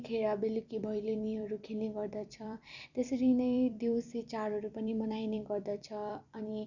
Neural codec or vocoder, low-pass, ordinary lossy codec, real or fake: vocoder, 44.1 kHz, 128 mel bands every 256 samples, BigVGAN v2; 7.2 kHz; none; fake